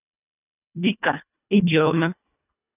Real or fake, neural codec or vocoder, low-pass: fake; codec, 24 kHz, 1.5 kbps, HILCodec; 3.6 kHz